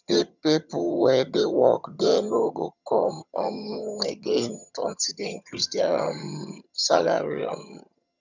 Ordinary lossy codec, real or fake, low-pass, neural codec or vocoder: none; fake; 7.2 kHz; vocoder, 22.05 kHz, 80 mel bands, HiFi-GAN